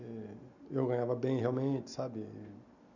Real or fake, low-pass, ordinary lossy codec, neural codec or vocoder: real; 7.2 kHz; none; none